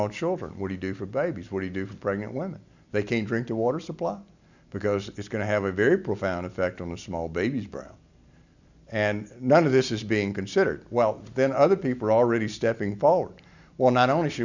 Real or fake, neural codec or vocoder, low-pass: real; none; 7.2 kHz